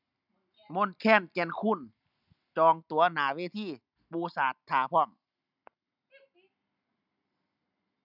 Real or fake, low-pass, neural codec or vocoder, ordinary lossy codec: real; 5.4 kHz; none; none